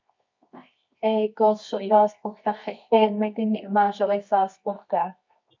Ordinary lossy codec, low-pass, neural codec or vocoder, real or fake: MP3, 48 kbps; 7.2 kHz; codec, 24 kHz, 0.9 kbps, WavTokenizer, medium music audio release; fake